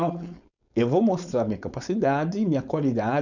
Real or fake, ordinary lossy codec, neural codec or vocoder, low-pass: fake; none; codec, 16 kHz, 4.8 kbps, FACodec; 7.2 kHz